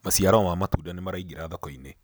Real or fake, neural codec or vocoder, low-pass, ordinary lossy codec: real; none; none; none